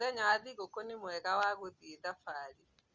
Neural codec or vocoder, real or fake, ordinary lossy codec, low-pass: none; real; Opus, 32 kbps; 7.2 kHz